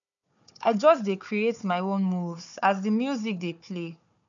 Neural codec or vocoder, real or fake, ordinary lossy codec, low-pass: codec, 16 kHz, 4 kbps, FunCodec, trained on Chinese and English, 50 frames a second; fake; none; 7.2 kHz